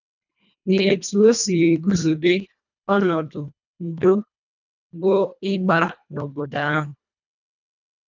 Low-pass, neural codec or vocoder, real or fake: 7.2 kHz; codec, 24 kHz, 1.5 kbps, HILCodec; fake